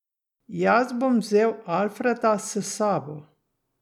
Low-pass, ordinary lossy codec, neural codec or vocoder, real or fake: 19.8 kHz; none; none; real